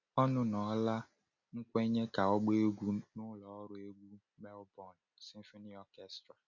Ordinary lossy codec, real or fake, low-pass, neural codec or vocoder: none; real; 7.2 kHz; none